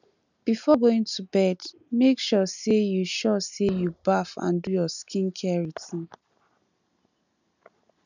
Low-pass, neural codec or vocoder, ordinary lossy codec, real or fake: 7.2 kHz; none; none; real